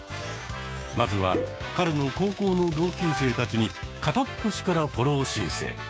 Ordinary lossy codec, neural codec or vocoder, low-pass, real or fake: none; codec, 16 kHz, 6 kbps, DAC; none; fake